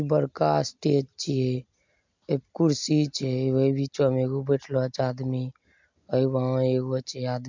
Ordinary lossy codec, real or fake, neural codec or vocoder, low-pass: MP3, 64 kbps; real; none; 7.2 kHz